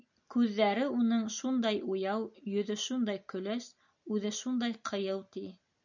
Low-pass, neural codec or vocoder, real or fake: 7.2 kHz; none; real